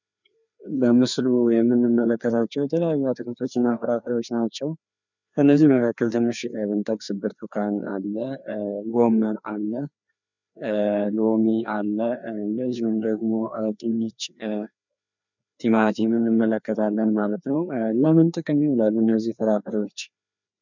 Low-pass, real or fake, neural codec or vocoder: 7.2 kHz; fake; codec, 16 kHz, 2 kbps, FreqCodec, larger model